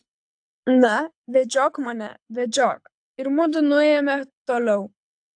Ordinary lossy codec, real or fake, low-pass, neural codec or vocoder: AAC, 64 kbps; fake; 9.9 kHz; codec, 24 kHz, 6 kbps, HILCodec